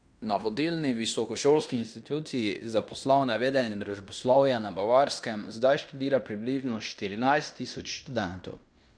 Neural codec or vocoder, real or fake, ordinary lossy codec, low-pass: codec, 16 kHz in and 24 kHz out, 0.9 kbps, LongCat-Audio-Codec, fine tuned four codebook decoder; fake; none; 9.9 kHz